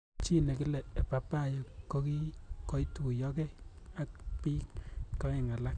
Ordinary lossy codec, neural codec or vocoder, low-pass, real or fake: none; none; 9.9 kHz; real